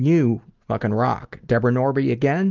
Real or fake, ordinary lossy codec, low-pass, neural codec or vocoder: real; Opus, 32 kbps; 7.2 kHz; none